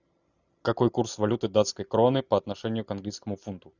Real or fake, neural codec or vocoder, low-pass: real; none; 7.2 kHz